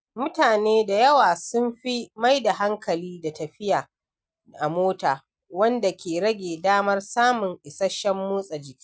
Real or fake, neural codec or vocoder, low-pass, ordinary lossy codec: real; none; none; none